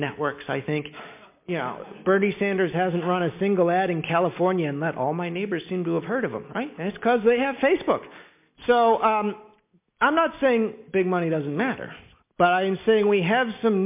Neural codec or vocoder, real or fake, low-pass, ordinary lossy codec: none; real; 3.6 kHz; MP3, 32 kbps